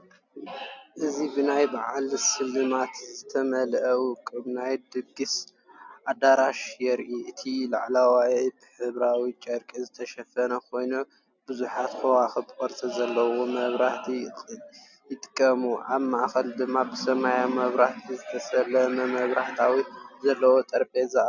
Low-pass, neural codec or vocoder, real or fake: 7.2 kHz; none; real